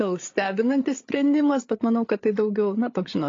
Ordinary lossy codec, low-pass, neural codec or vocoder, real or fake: AAC, 32 kbps; 7.2 kHz; codec, 16 kHz, 8 kbps, FreqCodec, larger model; fake